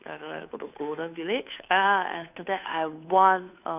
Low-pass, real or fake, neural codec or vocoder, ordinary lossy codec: 3.6 kHz; fake; codec, 16 kHz, 2 kbps, FunCodec, trained on Chinese and English, 25 frames a second; none